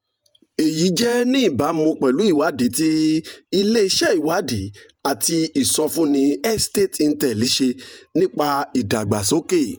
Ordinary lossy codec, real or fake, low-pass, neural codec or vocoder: none; fake; none; vocoder, 48 kHz, 128 mel bands, Vocos